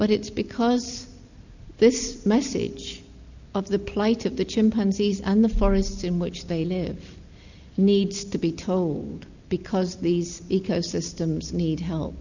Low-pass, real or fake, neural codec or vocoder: 7.2 kHz; real; none